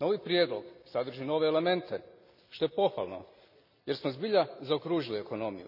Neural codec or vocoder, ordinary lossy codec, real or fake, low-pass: none; none; real; 5.4 kHz